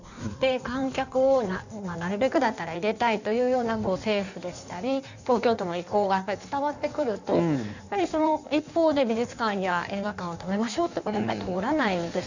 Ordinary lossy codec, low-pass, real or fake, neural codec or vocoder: none; 7.2 kHz; fake; codec, 16 kHz in and 24 kHz out, 1.1 kbps, FireRedTTS-2 codec